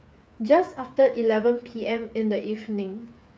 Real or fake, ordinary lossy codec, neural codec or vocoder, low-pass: fake; none; codec, 16 kHz, 8 kbps, FreqCodec, smaller model; none